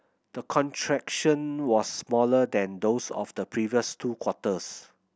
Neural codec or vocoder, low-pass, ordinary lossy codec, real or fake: none; none; none; real